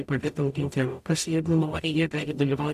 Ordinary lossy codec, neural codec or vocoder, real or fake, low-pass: AAC, 96 kbps; codec, 44.1 kHz, 0.9 kbps, DAC; fake; 14.4 kHz